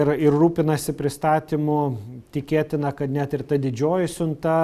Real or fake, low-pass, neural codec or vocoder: real; 14.4 kHz; none